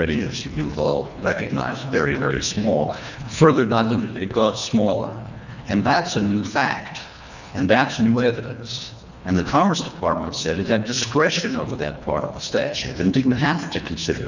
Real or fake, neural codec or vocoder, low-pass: fake; codec, 24 kHz, 1.5 kbps, HILCodec; 7.2 kHz